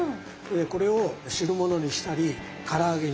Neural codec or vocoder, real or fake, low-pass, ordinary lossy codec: none; real; none; none